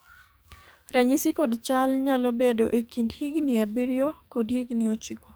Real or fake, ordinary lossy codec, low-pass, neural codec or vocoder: fake; none; none; codec, 44.1 kHz, 2.6 kbps, SNAC